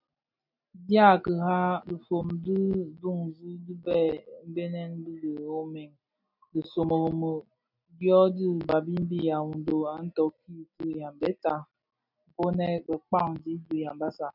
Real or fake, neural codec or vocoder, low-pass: real; none; 5.4 kHz